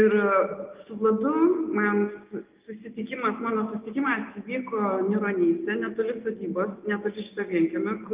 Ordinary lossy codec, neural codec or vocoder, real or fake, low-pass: Opus, 32 kbps; none; real; 3.6 kHz